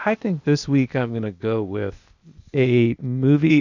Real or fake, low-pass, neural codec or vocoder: fake; 7.2 kHz; codec, 16 kHz, 0.8 kbps, ZipCodec